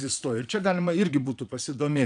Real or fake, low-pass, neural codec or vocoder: fake; 9.9 kHz; vocoder, 22.05 kHz, 80 mel bands, Vocos